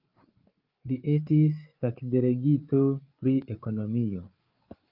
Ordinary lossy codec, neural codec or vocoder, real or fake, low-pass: Opus, 24 kbps; codec, 16 kHz, 8 kbps, FreqCodec, larger model; fake; 5.4 kHz